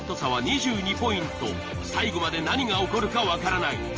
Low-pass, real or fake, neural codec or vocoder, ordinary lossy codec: 7.2 kHz; real; none; Opus, 24 kbps